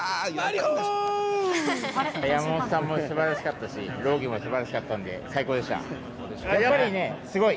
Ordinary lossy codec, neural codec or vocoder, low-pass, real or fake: none; none; none; real